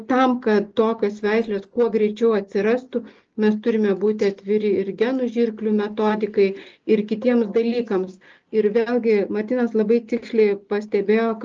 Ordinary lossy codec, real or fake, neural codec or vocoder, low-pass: Opus, 24 kbps; real; none; 7.2 kHz